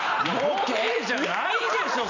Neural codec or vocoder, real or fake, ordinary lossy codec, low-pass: none; real; none; 7.2 kHz